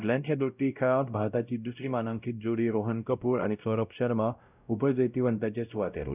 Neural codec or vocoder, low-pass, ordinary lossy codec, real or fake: codec, 16 kHz, 0.5 kbps, X-Codec, WavLM features, trained on Multilingual LibriSpeech; 3.6 kHz; none; fake